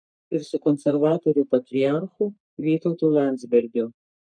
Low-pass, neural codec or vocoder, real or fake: 9.9 kHz; codec, 44.1 kHz, 3.4 kbps, Pupu-Codec; fake